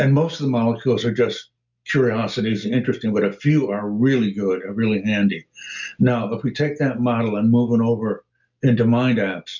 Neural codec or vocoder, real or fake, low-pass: none; real; 7.2 kHz